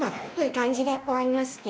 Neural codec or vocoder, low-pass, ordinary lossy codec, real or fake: codec, 16 kHz, 1 kbps, X-Codec, WavLM features, trained on Multilingual LibriSpeech; none; none; fake